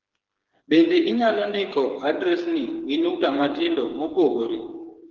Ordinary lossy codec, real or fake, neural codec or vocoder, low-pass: Opus, 16 kbps; fake; codec, 16 kHz, 4 kbps, FreqCodec, smaller model; 7.2 kHz